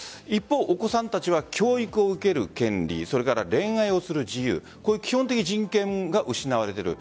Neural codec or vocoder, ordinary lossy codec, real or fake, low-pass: none; none; real; none